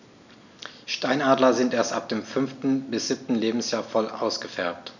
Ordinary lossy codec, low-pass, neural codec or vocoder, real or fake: none; 7.2 kHz; none; real